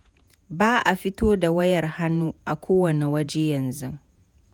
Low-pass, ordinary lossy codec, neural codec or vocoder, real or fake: none; none; vocoder, 48 kHz, 128 mel bands, Vocos; fake